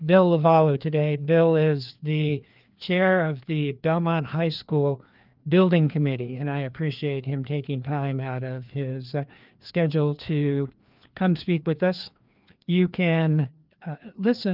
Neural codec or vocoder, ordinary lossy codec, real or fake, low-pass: codec, 16 kHz, 2 kbps, FreqCodec, larger model; Opus, 24 kbps; fake; 5.4 kHz